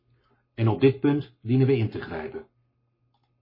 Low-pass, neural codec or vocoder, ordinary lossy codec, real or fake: 5.4 kHz; codec, 44.1 kHz, 7.8 kbps, Pupu-Codec; MP3, 24 kbps; fake